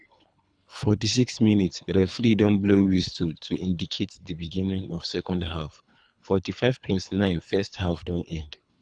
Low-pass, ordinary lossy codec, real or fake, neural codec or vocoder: 9.9 kHz; none; fake; codec, 24 kHz, 3 kbps, HILCodec